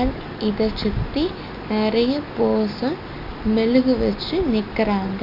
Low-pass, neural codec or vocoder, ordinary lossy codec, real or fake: 5.4 kHz; none; none; real